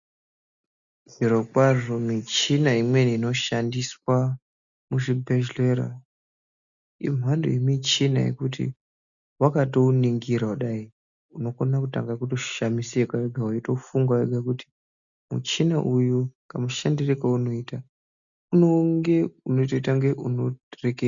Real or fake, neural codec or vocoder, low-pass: real; none; 7.2 kHz